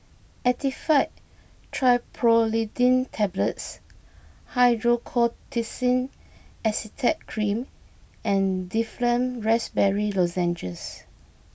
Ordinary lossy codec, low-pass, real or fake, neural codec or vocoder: none; none; real; none